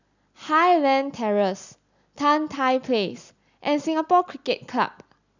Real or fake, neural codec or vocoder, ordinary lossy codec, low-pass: real; none; none; 7.2 kHz